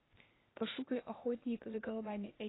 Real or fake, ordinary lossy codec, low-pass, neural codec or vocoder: fake; AAC, 16 kbps; 7.2 kHz; codec, 16 kHz, 0.8 kbps, ZipCodec